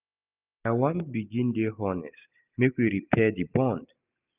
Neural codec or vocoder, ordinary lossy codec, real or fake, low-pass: none; none; real; 3.6 kHz